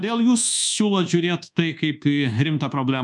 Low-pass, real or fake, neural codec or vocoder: 10.8 kHz; fake; codec, 24 kHz, 1.2 kbps, DualCodec